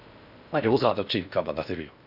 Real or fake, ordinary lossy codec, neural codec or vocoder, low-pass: fake; none; codec, 16 kHz in and 24 kHz out, 0.8 kbps, FocalCodec, streaming, 65536 codes; 5.4 kHz